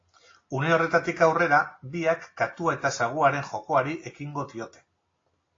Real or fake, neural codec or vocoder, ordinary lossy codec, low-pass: real; none; AAC, 32 kbps; 7.2 kHz